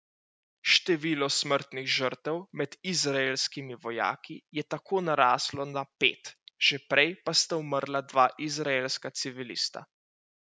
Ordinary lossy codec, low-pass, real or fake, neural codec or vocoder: none; none; real; none